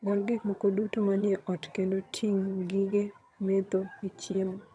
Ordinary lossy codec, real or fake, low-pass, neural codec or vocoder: none; fake; none; vocoder, 22.05 kHz, 80 mel bands, HiFi-GAN